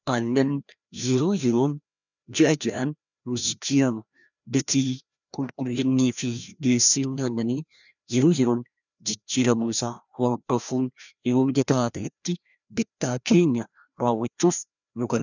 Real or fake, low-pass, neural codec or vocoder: fake; 7.2 kHz; codec, 16 kHz, 1 kbps, FreqCodec, larger model